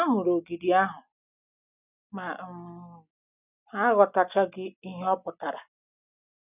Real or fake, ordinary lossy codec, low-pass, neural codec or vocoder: real; none; 3.6 kHz; none